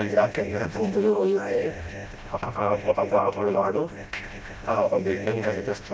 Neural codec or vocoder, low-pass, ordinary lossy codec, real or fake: codec, 16 kHz, 0.5 kbps, FreqCodec, smaller model; none; none; fake